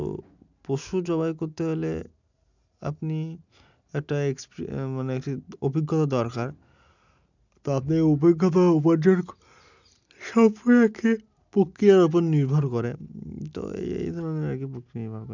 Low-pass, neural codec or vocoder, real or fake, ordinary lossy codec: 7.2 kHz; none; real; none